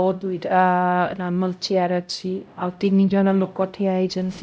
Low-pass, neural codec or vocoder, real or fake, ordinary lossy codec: none; codec, 16 kHz, 0.5 kbps, X-Codec, HuBERT features, trained on LibriSpeech; fake; none